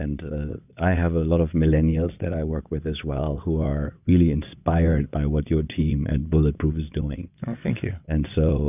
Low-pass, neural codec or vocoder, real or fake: 3.6 kHz; vocoder, 22.05 kHz, 80 mel bands, WaveNeXt; fake